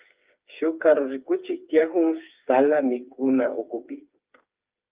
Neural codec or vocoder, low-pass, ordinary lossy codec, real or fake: codec, 16 kHz, 4 kbps, FreqCodec, smaller model; 3.6 kHz; Opus, 32 kbps; fake